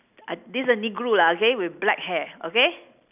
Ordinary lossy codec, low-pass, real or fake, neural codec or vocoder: none; 3.6 kHz; real; none